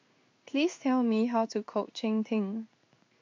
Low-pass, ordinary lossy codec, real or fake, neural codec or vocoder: 7.2 kHz; MP3, 48 kbps; fake; codec, 16 kHz in and 24 kHz out, 1 kbps, XY-Tokenizer